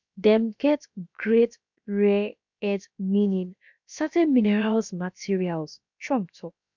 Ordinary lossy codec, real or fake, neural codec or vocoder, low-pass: none; fake; codec, 16 kHz, about 1 kbps, DyCAST, with the encoder's durations; 7.2 kHz